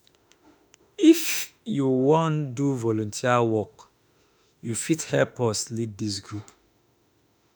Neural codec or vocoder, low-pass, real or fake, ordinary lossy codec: autoencoder, 48 kHz, 32 numbers a frame, DAC-VAE, trained on Japanese speech; none; fake; none